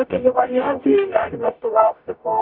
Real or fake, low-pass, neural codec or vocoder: fake; 5.4 kHz; codec, 44.1 kHz, 0.9 kbps, DAC